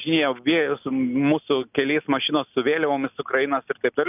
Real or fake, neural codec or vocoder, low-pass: real; none; 3.6 kHz